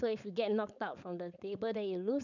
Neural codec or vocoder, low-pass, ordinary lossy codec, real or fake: codec, 16 kHz, 4.8 kbps, FACodec; 7.2 kHz; none; fake